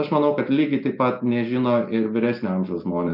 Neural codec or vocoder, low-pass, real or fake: none; 5.4 kHz; real